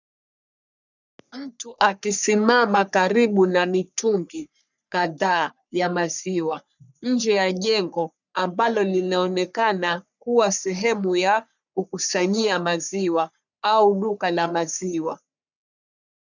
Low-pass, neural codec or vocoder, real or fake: 7.2 kHz; codec, 44.1 kHz, 3.4 kbps, Pupu-Codec; fake